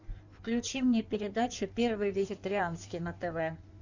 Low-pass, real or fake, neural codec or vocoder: 7.2 kHz; fake; codec, 16 kHz in and 24 kHz out, 1.1 kbps, FireRedTTS-2 codec